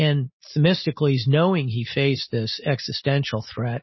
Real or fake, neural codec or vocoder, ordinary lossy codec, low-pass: real; none; MP3, 24 kbps; 7.2 kHz